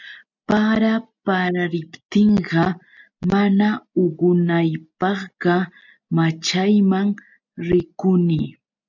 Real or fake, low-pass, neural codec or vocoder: real; 7.2 kHz; none